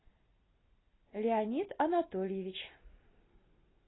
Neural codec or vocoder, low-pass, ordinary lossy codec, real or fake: none; 7.2 kHz; AAC, 16 kbps; real